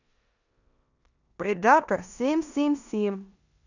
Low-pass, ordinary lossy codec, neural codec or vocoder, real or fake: 7.2 kHz; none; codec, 16 kHz in and 24 kHz out, 0.9 kbps, LongCat-Audio-Codec, four codebook decoder; fake